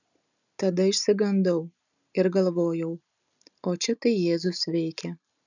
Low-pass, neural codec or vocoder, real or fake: 7.2 kHz; none; real